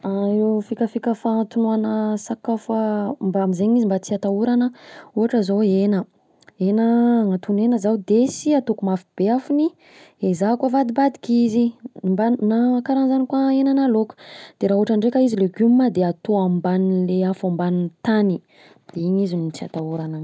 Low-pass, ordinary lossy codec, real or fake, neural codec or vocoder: none; none; real; none